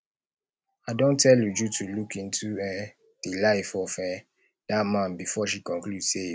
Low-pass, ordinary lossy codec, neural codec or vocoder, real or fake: none; none; none; real